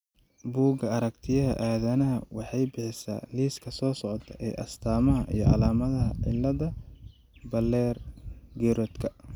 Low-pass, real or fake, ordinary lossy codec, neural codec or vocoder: 19.8 kHz; real; none; none